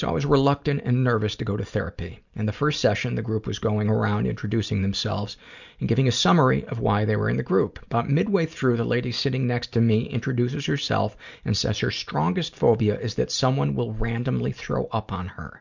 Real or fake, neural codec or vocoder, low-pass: real; none; 7.2 kHz